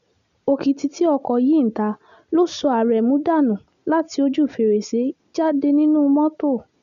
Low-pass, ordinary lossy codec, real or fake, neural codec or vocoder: 7.2 kHz; none; real; none